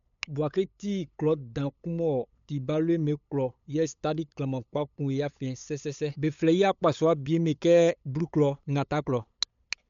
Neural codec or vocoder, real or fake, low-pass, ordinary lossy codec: codec, 16 kHz, 8 kbps, FunCodec, trained on LibriTTS, 25 frames a second; fake; 7.2 kHz; MP3, 64 kbps